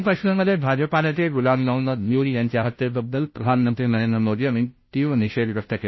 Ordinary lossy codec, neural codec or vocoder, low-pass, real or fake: MP3, 24 kbps; codec, 16 kHz, 0.5 kbps, FunCodec, trained on Chinese and English, 25 frames a second; 7.2 kHz; fake